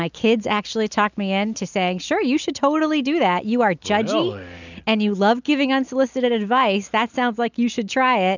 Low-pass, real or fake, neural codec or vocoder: 7.2 kHz; real; none